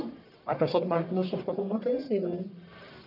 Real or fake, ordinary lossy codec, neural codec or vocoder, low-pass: fake; none; codec, 44.1 kHz, 1.7 kbps, Pupu-Codec; 5.4 kHz